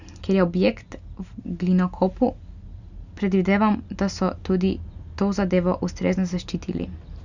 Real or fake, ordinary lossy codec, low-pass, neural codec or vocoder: real; none; 7.2 kHz; none